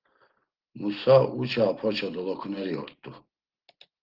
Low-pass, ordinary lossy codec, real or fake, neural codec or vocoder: 5.4 kHz; Opus, 16 kbps; real; none